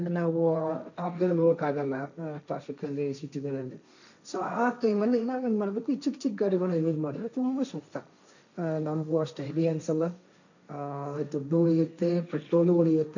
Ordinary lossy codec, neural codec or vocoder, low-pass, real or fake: none; codec, 16 kHz, 1.1 kbps, Voila-Tokenizer; 7.2 kHz; fake